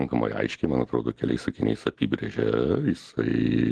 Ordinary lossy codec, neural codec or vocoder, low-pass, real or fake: Opus, 16 kbps; none; 10.8 kHz; real